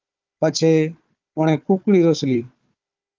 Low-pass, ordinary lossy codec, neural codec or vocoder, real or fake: 7.2 kHz; Opus, 24 kbps; codec, 16 kHz, 4 kbps, FunCodec, trained on Chinese and English, 50 frames a second; fake